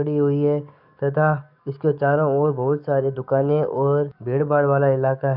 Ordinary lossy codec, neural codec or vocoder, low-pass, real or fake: none; codec, 44.1 kHz, 7.8 kbps, DAC; 5.4 kHz; fake